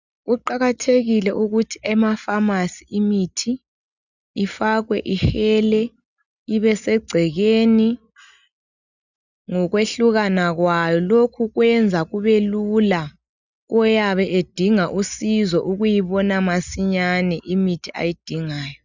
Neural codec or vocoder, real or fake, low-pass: none; real; 7.2 kHz